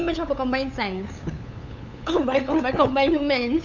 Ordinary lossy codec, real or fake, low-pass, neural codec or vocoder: none; fake; 7.2 kHz; codec, 16 kHz, 8 kbps, FunCodec, trained on LibriTTS, 25 frames a second